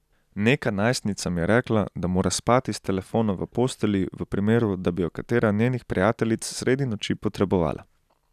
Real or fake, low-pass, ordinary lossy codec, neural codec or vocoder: real; 14.4 kHz; none; none